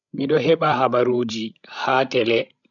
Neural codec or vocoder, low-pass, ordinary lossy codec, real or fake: codec, 16 kHz, 16 kbps, FreqCodec, larger model; 7.2 kHz; none; fake